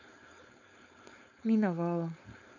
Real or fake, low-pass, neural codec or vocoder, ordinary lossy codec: fake; 7.2 kHz; codec, 16 kHz, 4.8 kbps, FACodec; none